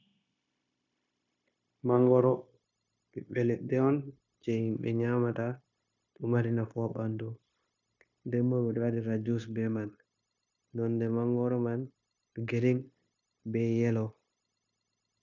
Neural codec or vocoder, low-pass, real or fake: codec, 16 kHz, 0.9 kbps, LongCat-Audio-Codec; 7.2 kHz; fake